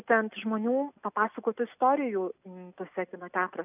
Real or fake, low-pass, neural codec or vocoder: real; 3.6 kHz; none